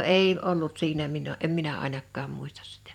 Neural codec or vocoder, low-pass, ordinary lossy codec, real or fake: vocoder, 44.1 kHz, 128 mel bands every 256 samples, BigVGAN v2; 19.8 kHz; none; fake